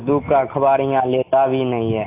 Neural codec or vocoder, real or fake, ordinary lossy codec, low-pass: none; real; AAC, 24 kbps; 3.6 kHz